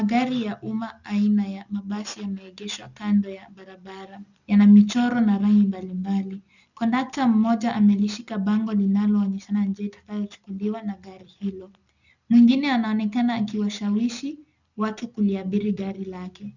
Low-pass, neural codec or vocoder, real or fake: 7.2 kHz; none; real